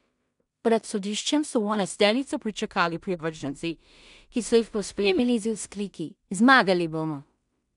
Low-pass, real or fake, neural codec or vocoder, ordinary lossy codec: 10.8 kHz; fake; codec, 16 kHz in and 24 kHz out, 0.4 kbps, LongCat-Audio-Codec, two codebook decoder; none